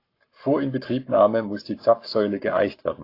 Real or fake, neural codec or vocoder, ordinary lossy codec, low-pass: fake; codec, 44.1 kHz, 7.8 kbps, Pupu-Codec; AAC, 32 kbps; 5.4 kHz